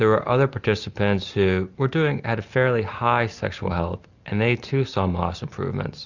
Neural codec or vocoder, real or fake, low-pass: none; real; 7.2 kHz